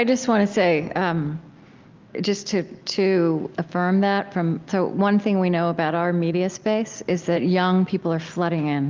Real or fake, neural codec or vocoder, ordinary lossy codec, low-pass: real; none; Opus, 32 kbps; 7.2 kHz